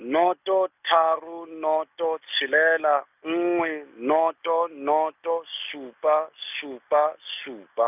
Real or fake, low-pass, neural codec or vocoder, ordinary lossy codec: real; 3.6 kHz; none; none